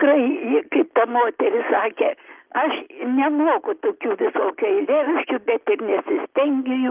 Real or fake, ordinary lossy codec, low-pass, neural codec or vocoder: real; Opus, 32 kbps; 3.6 kHz; none